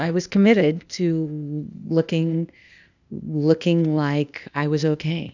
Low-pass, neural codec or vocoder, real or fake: 7.2 kHz; codec, 16 kHz, 0.8 kbps, ZipCodec; fake